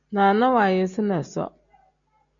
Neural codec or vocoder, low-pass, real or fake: none; 7.2 kHz; real